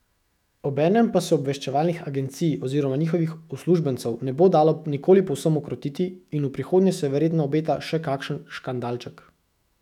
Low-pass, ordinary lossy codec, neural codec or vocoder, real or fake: 19.8 kHz; none; autoencoder, 48 kHz, 128 numbers a frame, DAC-VAE, trained on Japanese speech; fake